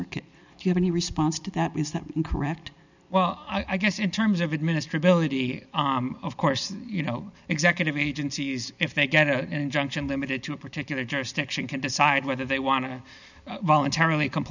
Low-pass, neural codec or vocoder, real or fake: 7.2 kHz; none; real